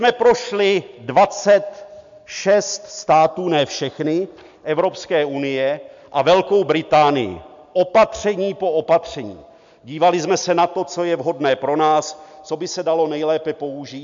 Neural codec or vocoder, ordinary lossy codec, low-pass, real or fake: none; MP3, 64 kbps; 7.2 kHz; real